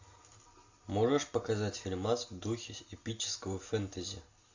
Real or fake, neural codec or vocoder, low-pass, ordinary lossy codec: real; none; 7.2 kHz; AAC, 48 kbps